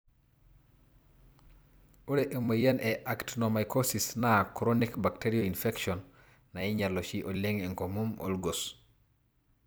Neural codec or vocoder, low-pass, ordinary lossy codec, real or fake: vocoder, 44.1 kHz, 128 mel bands every 256 samples, BigVGAN v2; none; none; fake